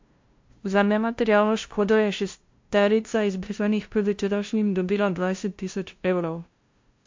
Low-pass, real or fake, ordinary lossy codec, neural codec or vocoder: 7.2 kHz; fake; MP3, 48 kbps; codec, 16 kHz, 0.5 kbps, FunCodec, trained on LibriTTS, 25 frames a second